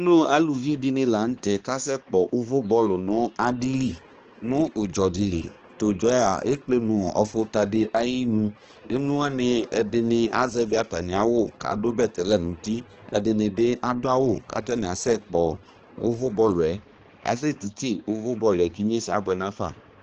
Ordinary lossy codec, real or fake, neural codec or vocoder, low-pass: Opus, 16 kbps; fake; codec, 16 kHz, 2 kbps, X-Codec, HuBERT features, trained on balanced general audio; 7.2 kHz